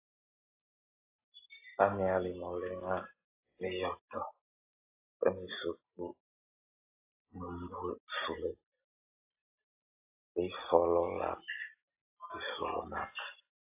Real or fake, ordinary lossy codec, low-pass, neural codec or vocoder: real; AAC, 24 kbps; 3.6 kHz; none